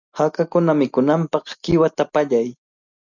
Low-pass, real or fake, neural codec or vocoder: 7.2 kHz; real; none